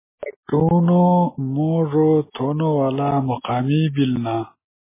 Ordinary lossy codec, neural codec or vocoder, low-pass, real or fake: MP3, 16 kbps; none; 3.6 kHz; real